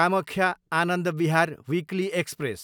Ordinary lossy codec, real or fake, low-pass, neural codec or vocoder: none; real; none; none